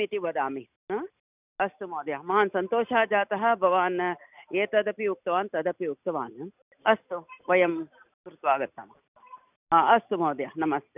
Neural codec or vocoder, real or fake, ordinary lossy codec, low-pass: none; real; none; 3.6 kHz